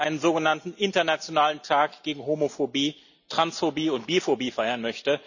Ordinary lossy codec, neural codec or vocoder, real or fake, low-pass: none; none; real; 7.2 kHz